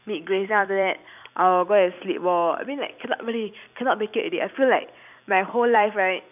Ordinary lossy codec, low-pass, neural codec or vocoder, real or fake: none; 3.6 kHz; vocoder, 44.1 kHz, 128 mel bands every 256 samples, BigVGAN v2; fake